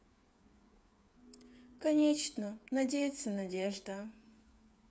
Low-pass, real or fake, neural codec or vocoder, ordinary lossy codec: none; fake; codec, 16 kHz, 8 kbps, FreqCodec, smaller model; none